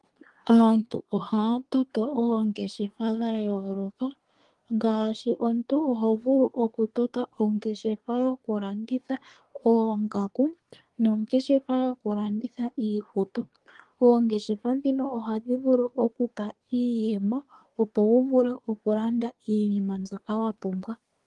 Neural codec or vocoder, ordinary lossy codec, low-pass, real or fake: codec, 24 kHz, 1 kbps, SNAC; Opus, 24 kbps; 10.8 kHz; fake